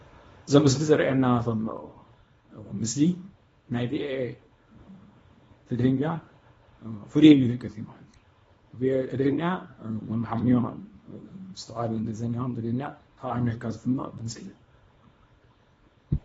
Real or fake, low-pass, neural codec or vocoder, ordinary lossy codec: fake; 10.8 kHz; codec, 24 kHz, 0.9 kbps, WavTokenizer, small release; AAC, 24 kbps